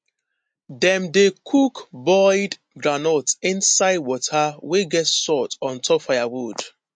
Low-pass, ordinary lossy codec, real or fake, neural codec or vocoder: 9.9 kHz; MP3, 48 kbps; real; none